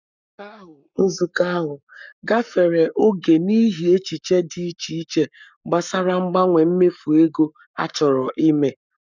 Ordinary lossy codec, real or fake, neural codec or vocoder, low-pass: none; fake; codec, 44.1 kHz, 7.8 kbps, Pupu-Codec; 7.2 kHz